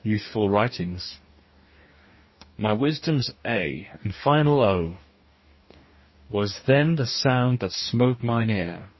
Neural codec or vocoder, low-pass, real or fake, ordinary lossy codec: codec, 44.1 kHz, 2.6 kbps, DAC; 7.2 kHz; fake; MP3, 24 kbps